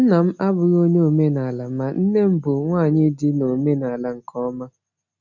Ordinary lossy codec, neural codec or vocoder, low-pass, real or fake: none; none; 7.2 kHz; real